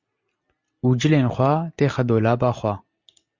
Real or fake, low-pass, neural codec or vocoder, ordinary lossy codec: real; 7.2 kHz; none; Opus, 64 kbps